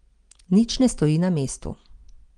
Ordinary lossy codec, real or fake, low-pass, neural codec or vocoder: Opus, 24 kbps; real; 9.9 kHz; none